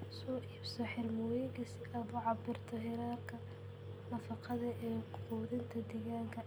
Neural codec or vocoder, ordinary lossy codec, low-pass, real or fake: none; none; none; real